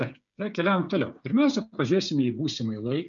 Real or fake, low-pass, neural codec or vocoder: fake; 7.2 kHz; codec, 16 kHz, 8 kbps, FreqCodec, smaller model